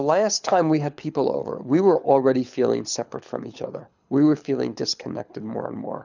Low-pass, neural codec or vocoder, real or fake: 7.2 kHz; codec, 24 kHz, 6 kbps, HILCodec; fake